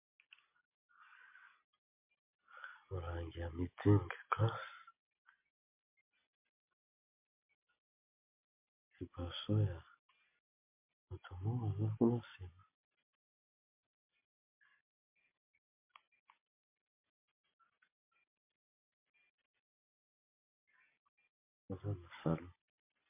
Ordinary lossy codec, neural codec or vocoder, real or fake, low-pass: MP3, 24 kbps; none; real; 3.6 kHz